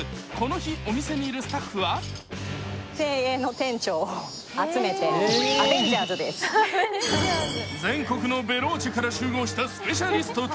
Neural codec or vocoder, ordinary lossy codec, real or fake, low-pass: none; none; real; none